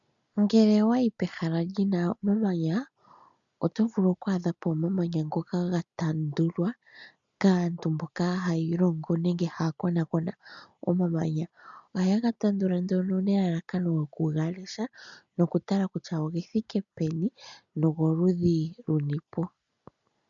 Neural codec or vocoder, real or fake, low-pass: none; real; 7.2 kHz